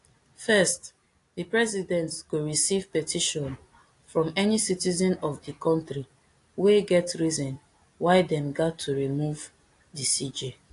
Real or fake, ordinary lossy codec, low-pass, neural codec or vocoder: real; AAC, 48 kbps; 10.8 kHz; none